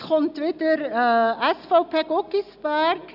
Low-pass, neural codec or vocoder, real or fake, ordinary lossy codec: 5.4 kHz; none; real; none